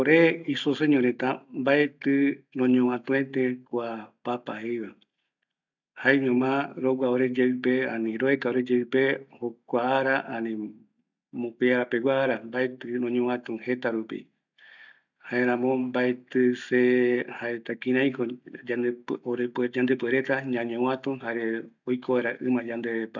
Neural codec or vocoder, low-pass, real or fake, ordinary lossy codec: none; 7.2 kHz; real; none